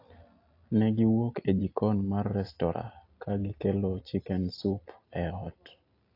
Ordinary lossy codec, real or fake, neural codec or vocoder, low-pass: AAC, 32 kbps; real; none; 5.4 kHz